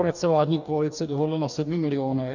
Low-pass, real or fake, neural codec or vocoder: 7.2 kHz; fake; codec, 44.1 kHz, 2.6 kbps, DAC